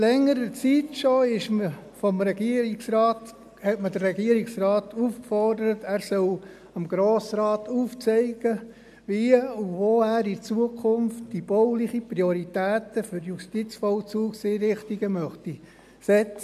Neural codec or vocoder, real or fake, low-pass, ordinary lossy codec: none; real; 14.4 kHz; MP3, 96 kbps